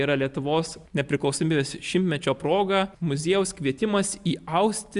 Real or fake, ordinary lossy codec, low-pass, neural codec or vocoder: real; Opus, 64 kbps; 10.8 kHz; none